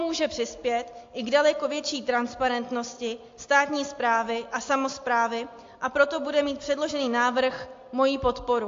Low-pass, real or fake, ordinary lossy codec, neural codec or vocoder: 7.2 kHz; real; AAC, 48 kbps; none